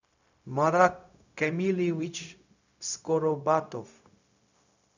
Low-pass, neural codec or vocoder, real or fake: 7.2 kHz; codec, 16 kHz, 0.4 kbps, LongCat-Audio-Codec; fake